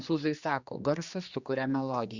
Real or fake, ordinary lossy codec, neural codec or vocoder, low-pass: fake; Opus, 64 kbps; codec, 16 kHz, 2 kbps, X-Codec, HuBERT features, trained on general audio; 7.2 kHz